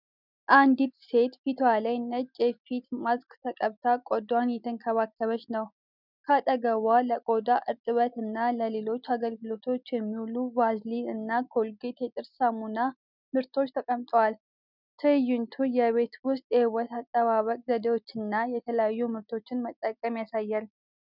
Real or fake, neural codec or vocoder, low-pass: real; none; 5.4 kHz